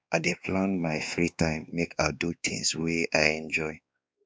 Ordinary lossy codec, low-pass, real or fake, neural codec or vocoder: none; none; fake; codec, 16 kHz, 2 kbps, X-Codec, WavLM features, trained on Multilingual LibriSpeech